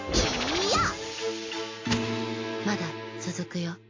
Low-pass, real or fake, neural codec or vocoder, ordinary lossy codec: 7.2 kHz; real; none; AAC, 32 kbps